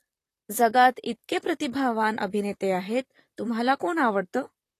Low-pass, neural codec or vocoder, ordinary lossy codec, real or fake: 14.4 kHz; vocoder, 44.1 kHz, 128 mel bands, Pupu-Vocoder; AAC, 48 kbps; fake